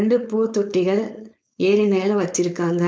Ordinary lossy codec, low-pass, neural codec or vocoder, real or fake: none; none; codec, 16 kHz, 4.8 kbps, FACodec; fake